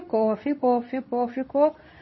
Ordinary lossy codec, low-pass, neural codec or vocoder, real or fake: MP3, 24 kbps; 7.2 kHz; codec, 24 kHz, 0.9 kbps, WavTokenizer, medium speech release version 2; fake